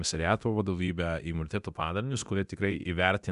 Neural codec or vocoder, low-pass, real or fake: codec, 24 kHz, 0.9 kbps, DualCodec; 10.8 kHz; fake